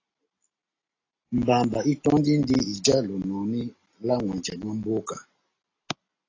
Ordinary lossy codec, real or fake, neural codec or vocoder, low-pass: AAC, 32 kbps; real; none; 7.2 kHz